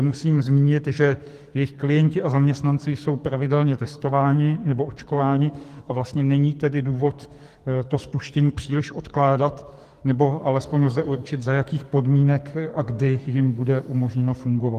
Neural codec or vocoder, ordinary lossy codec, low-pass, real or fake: codec, 44.1 kHz, 2.6 kbps, SNAC; Opus, 24 kbps; 14.4 kHz; fake